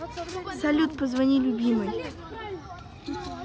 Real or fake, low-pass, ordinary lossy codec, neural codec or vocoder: real; none; none; none